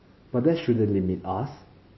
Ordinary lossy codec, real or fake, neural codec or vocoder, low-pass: MP3, 24 kbps; real; none; 7.2 kHz